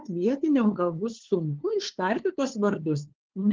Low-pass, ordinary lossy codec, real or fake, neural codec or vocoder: 7.2 kHz; Opus, 16 kbps; fake; codec, 16 kHz, 2 kbps, FunCodec, trained on LibriTTS, 25 frames a second